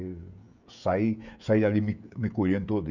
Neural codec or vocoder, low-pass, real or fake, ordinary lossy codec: codec, 16 kHz, 16 kbps, FreqCodec, smaller model; 7.2 kHz; fake; none